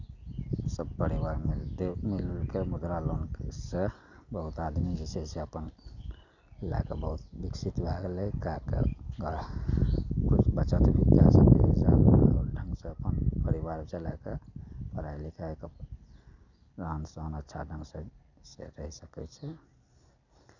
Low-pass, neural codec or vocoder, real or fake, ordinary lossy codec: 7.2 kHz; none; real; none